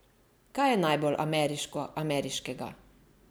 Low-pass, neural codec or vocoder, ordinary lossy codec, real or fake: none; none; none; real